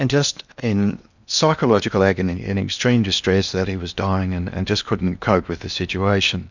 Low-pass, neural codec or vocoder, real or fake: 7.2 kHz; codec, 16 kHz in and 24 kHz out, 0.8 kbps, FocalCodec, streaming, 65536 codes; fake